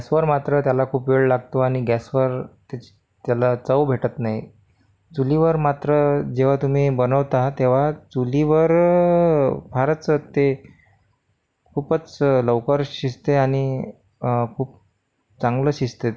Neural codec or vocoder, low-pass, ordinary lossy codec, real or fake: none; none; none; real